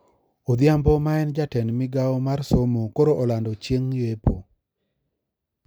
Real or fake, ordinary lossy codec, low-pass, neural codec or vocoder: real; none; none; none